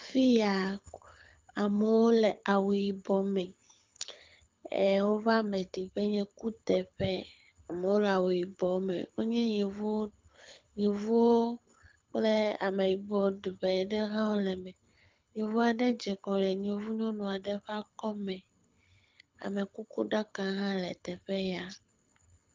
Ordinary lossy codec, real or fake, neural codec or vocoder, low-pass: Opus, 24 kbps; fake; codec, 24 kHz, 6 kbps, HILCodec; 7.2 kHz